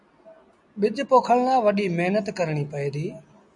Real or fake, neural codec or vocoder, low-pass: real; none; 10.8 kHz